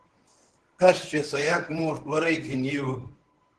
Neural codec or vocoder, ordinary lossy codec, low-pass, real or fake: codec, 24 kHz, 0.9 kbps, WavTokenizer, medium speech release version 1; Opus, 16 kbps; 10.8 kHz; fake